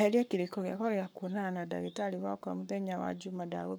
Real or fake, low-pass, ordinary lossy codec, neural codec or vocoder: fake; none; none; codec, 44.1 kHz, 7.8 kbps, Pupu-Codec